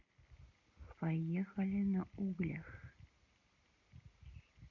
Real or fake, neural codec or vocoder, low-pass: real; none; 7.2 kHz